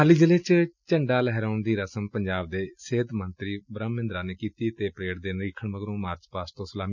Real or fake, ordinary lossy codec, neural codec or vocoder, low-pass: real; none; none; 7.2 kHz